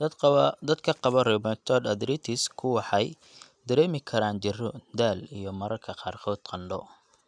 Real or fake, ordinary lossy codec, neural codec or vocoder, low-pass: real; none; none; 9.9 kHz